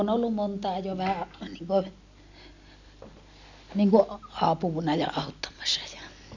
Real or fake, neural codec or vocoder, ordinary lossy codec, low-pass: real; none; none; 7.2 kHz